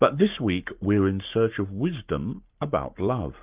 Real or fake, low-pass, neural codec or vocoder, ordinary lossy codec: fake; 3.6 kHz; codec, 44.1 kHz, 7.8 kbps, Pupu-Codec; Opus, 32 kbps